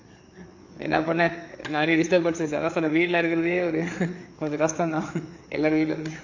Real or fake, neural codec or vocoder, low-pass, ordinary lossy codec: fake; codec, 16 kHz, 4 kbps, FreqCodec, larger model; 7.2 kHz; AAC, 48 kbps